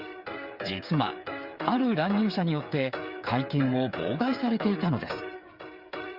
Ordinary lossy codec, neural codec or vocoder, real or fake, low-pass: Opus, 64 kbps; codec, 16 kHz, 8 kbps, FreqCodec, smaller model; fake; 5.4 kHz